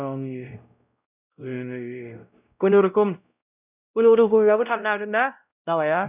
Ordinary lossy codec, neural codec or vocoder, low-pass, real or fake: none; codec, 16 kHz, 0.5 kbps, X-Codec, WavLM features, trained on Multilingual LibriSpeech; 3.6 kHz; fake